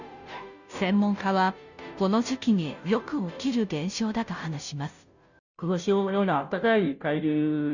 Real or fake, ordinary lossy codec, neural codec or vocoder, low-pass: fake; none; codec, 16 kHz, 0.5 kbps, FunCodec, trained on Chinese and English, 25 frames a second; 7.2 kHz